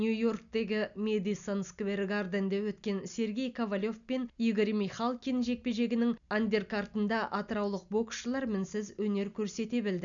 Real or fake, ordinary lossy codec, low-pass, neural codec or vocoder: real; none; 7.2 kHz; none